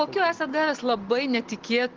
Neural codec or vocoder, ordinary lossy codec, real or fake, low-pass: none; Opus, 16 kbps; real; 7.2 kHz